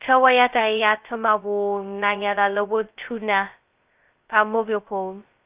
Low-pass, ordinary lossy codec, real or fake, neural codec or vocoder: 3.6 kHz; Opus, 24 kbps; fake; codec, 16 kHz, 0.2 kbps, FocalCodec